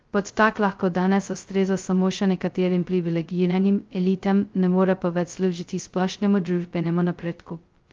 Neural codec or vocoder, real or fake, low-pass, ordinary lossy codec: codec, 16 kHz, 0.2 kbps, FocalCodec; fake; 7.2 kHz; Opus, 32 kbps